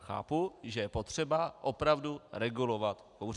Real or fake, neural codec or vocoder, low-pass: real; none; 10.8 kHz